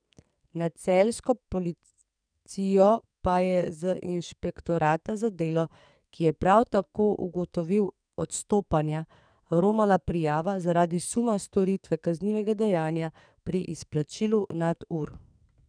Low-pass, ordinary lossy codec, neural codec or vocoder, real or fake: 9.9 kHz; none; codec, 44.1 kHz, 2.6 kbps, SNAC; fake